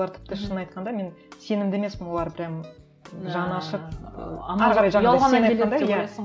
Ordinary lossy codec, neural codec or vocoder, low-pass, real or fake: none; none; none; real